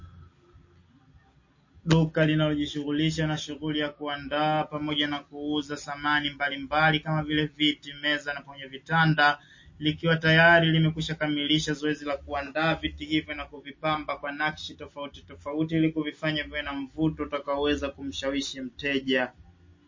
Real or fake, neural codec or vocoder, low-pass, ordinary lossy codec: real; none; 7.2 kHz; MP3, 32 kbps